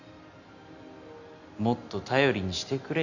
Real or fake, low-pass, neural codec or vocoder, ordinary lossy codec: real; 7.2 kHz; none; none